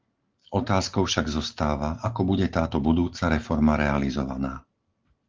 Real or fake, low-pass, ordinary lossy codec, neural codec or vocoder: real; 7.2 kHz; Opus, 16 kbps; none